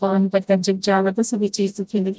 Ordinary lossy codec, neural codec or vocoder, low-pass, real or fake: none; codec, 16 kHz, 0.5 kbps, FreqCodec, smaller model; none; fake